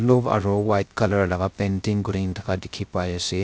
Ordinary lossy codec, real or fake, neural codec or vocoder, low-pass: none; fake; codec, 16 kHz, 0.3 kbps, FocalCodec; none